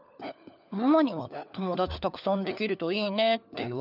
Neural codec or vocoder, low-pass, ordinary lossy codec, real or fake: codec, 16 kHz, 4 kbps, FunCodec, trained on LibriTTS, 50 frames a second; 5.4 kHz; none; fake